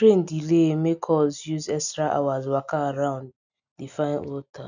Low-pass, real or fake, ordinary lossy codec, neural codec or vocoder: 7.2 kHz; real; none; none